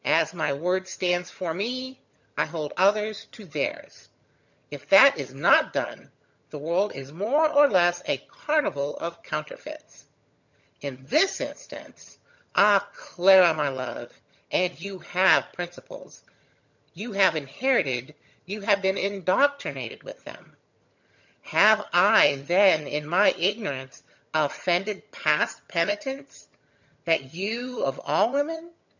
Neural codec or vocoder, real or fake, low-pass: vocoder, 22.05 kHz, 80 mel bands, HiFi-GAN; fake; 7.2 kHz